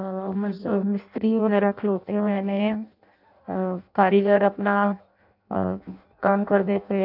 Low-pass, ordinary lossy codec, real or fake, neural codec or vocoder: 5.4 kHz; none; fake; codec, 16 kHz in and 24 kHz out, 0.6 kbps, FireRedTTS-2 codec